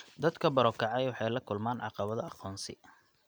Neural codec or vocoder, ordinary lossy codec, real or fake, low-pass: none; none; real; none